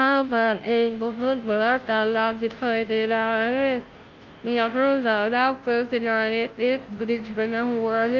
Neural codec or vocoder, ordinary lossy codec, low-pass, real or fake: codec, 16 kHz, 0.5 kbps, FunCodec, trained on Chinese and English, 25 frames a second; Opus, 32 kbps; 7.2 kHz; fake